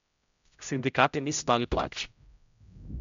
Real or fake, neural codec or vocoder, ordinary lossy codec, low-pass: fake; codec, 16 kHz, 0.5 kbps, X-Codec, HuBERT features, trained on general audio; MP3, 64 kbps; 7.2 kHz